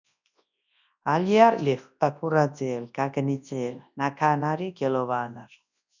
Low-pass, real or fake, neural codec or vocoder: 7.2 kHz; fake; codec, 24 kHz, 0.9 kbps, WavTokenizer, large speech release